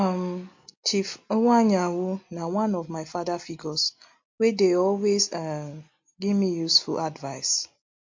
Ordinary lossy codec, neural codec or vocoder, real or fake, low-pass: MP3, 48 kbps; none; real; 7.2 kHz